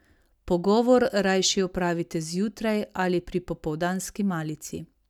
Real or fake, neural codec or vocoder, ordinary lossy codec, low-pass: real; none; none; 19.8 kHz